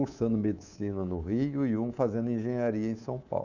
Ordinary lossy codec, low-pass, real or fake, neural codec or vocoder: none; 7.2 kHz; fake; codec, 24 kHz, 3.1 kbps, DualCodec